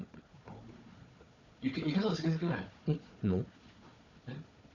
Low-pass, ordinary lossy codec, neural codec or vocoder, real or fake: 7.2 kHz; none; codec, 16 kHz, 4 kbps, FunCodec, trained on Chinese and English, 50 frames a second; fake